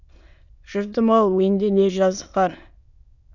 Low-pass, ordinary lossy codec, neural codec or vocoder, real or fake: 7.2 kHz; none; autoencoder, 22.05 kHz, a latent of 192 numbers a frame, VITS, trained on many speakers; fake